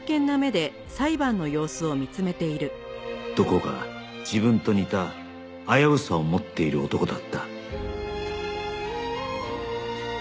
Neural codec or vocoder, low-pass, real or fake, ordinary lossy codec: none; none; real; none